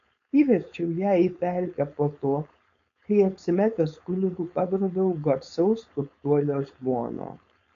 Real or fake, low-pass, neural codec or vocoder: fake; 7.2 kHz; codec, 16 kHz, 4.8 kbps, FACodec